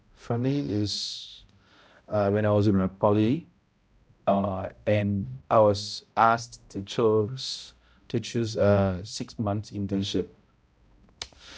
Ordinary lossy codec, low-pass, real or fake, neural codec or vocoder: none; none; fake; codec, 16 kHz, 0.5 kbps, X-Codec, HuBERT features, trained on balanced general audio